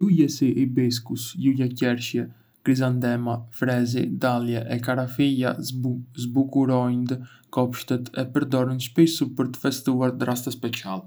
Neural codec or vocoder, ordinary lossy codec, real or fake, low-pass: none; none; real; none